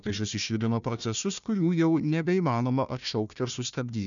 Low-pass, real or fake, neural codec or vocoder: 7.2 kHz; fake; codec, 16 kHz, 1 kbps, FunCodec, trained on Chinese and English, 50 frames a second